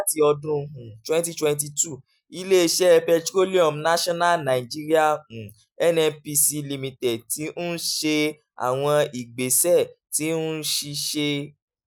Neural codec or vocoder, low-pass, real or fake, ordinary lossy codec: none; none; real; none